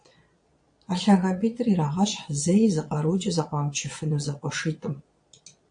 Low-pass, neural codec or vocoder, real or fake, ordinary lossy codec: 9.9 kHz; vocoder, 22.05 kHz, 80 mel bands, Vocos; fake; AAC, 64 kbps